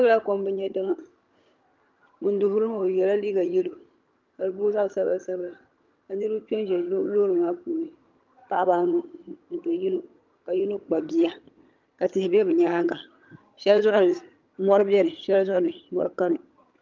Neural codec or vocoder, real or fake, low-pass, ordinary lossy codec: vocoder, 22.05 kHz, 80 mel bands, HiFi-GAN; fake; 7.2 kHz; Opus, 24 kbps